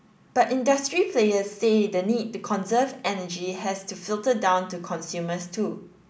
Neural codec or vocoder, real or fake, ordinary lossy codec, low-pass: none; real; none; none